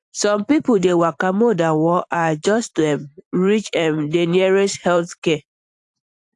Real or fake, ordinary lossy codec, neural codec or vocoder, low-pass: fake; AAC, 64 kbps; vocoder, 44.1 kHz, 128 mel bands every 512 samples, BigVGAN v2; 10.8 kHz